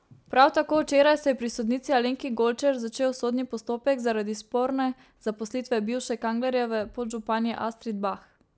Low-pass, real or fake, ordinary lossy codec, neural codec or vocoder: none; real; none; none